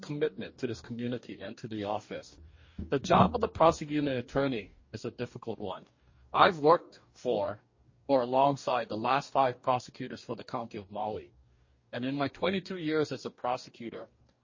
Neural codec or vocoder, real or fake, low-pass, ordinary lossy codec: codec, 44.1 kHz, 2.6 kbps, DAC; fake; 7.2 kHz; MP3, 32 kbps